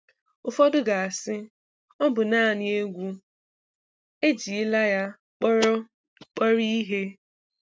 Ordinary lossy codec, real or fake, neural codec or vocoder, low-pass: none; real; none; none